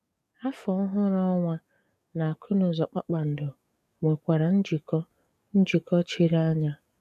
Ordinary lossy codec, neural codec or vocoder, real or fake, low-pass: none; codec, 44.1 kHz, 7.8 kbps, DAC; fake; 14.4 kHz